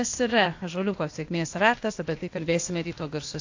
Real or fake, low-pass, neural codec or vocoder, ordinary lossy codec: fake; 7.2 kHz; codec, 16 kHz, 0.8 kbps, ZipCodec; AAC, 48 kbps